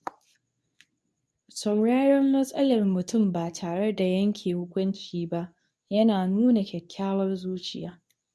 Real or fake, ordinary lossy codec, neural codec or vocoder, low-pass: fake; none; codec, 24 kHz, 0.9 kbps, WavTokenizer, medium speech release version 2; none